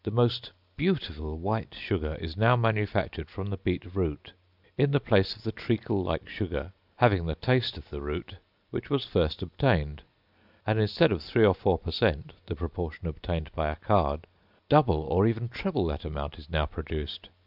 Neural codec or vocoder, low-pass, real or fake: none; 5.4 kHz; real